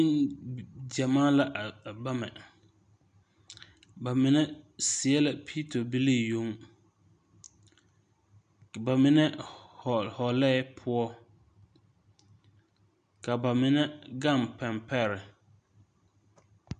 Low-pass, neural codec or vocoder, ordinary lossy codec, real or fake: 9.9 kHz; none; MP3, 96 kbps; real